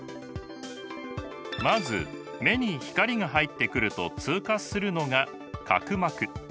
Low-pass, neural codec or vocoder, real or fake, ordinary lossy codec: none; none; real; none